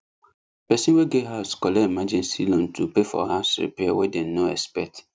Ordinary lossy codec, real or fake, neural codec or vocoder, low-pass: none; real; none; none